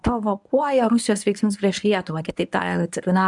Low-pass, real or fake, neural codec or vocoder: 10.8 kHz; fake; codec, 24 kHz, 0.9 kbps, WavTokenizer, medium speech release version 1